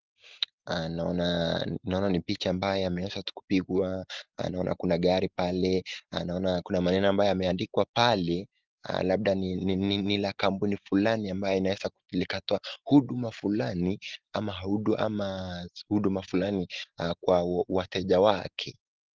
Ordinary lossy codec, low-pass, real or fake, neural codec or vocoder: Opus, 16 kbps; 7.2 kHz; real; none